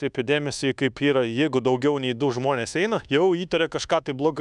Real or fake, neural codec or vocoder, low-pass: fake; codec, 24 kHz, 1.2 kbps, DualCodec; 10.8 kHz